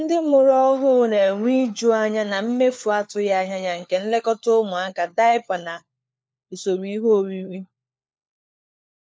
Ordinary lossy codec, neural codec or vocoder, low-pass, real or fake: none; codec, 16 kHz, 4 kbps, FunCodec, trained on LibriTTS, 50 frames a second; none; fake